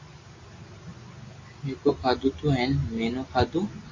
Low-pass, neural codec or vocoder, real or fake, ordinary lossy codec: 7.2 kHz; none; real; MP3, 32 kbps